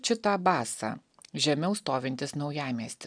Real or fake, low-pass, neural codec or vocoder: real; 9.9 kHz; none